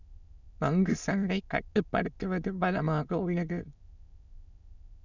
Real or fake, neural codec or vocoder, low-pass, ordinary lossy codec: fake; autoencoder, 22.05 kHz, a latent of 192 numbers a frame, VITS, trained on many speakers; 7.2 kHz; none